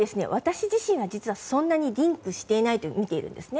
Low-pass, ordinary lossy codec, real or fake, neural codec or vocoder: none; none; real; none